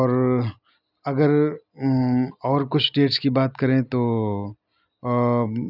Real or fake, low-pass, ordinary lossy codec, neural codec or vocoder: real; 5.4 kHz; none; none